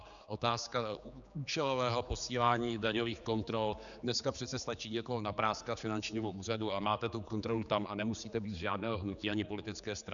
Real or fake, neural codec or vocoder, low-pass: fake; codec, 16 kHz, 4 kbps, X-Codec, HuBERT features, trained on general audio; 7.2 kHz